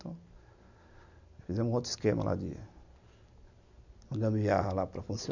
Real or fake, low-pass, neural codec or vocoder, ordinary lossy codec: real; 7.2 kHz; none; none